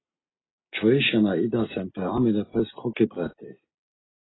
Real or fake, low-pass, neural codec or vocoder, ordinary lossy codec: real; 7.2 kHz; none; AAC, 16 kbps